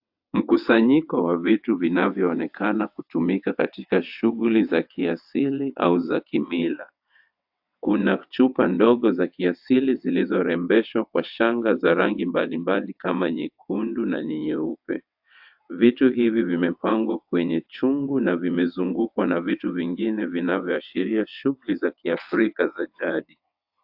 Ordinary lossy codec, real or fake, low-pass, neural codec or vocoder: AAC, 48 kbps; fake; 5.4 kHz; vocoder, 22.05 kHz, 80 mel bands, WaveNeXt